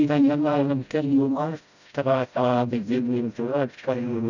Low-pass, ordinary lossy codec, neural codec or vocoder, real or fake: 7.2 kHz; none; codec, 16 kHz, 0.5 kbps, FreqCodec, smaller model; fake